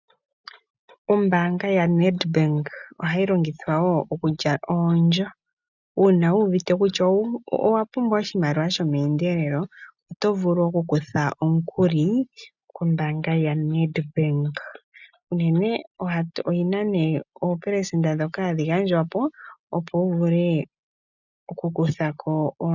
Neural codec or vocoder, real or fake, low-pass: none; real; 7.2 kHz